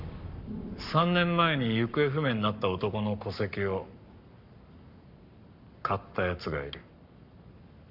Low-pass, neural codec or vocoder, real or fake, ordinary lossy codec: 5.4 kHz; codec, 44.1 kHz, 7.8 kbps, Pupu-Codec; fake; none